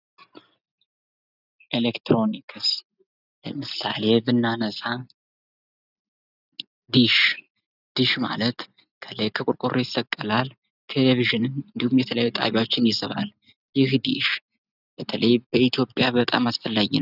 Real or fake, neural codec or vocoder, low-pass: real; none; 5.4 kHz